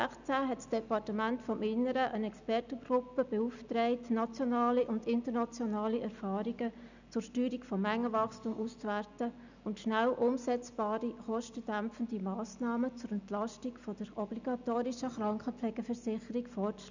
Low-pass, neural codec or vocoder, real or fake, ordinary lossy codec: 7.2 kHz; none; real; none